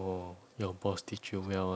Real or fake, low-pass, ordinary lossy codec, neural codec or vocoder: real; none; none; none